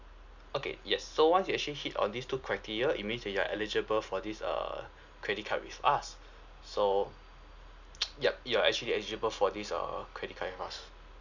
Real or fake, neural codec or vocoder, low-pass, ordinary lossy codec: real; none; 7.2 kHz; none